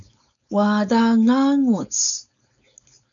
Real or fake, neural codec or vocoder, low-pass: fake; codec, 16 kHz, 4.8 kbps, FACodec; 7.2 kHz